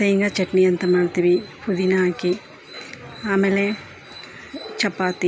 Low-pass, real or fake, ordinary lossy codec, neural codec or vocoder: none; real; none; none